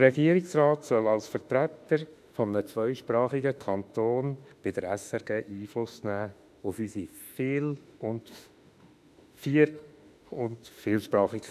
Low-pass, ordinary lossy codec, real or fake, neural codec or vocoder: 14.4 kHz; none; fake; autoencoder, 48 kHz, 32 numbers a frame, DAC-VAE, trained on Japanese speech